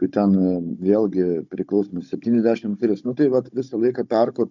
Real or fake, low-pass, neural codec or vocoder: fake; 7.2 kHz; codec, 16 kHz, 16 kbps, FunCodec, trained on Chinese and English, 50 frames a second